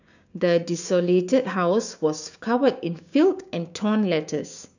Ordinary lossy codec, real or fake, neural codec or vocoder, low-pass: none; fake; codec, 16 kHz, 6 kbps, DAC; 7.2 kHz